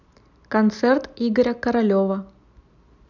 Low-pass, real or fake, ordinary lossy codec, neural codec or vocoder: 7.2 kHz; real; none; none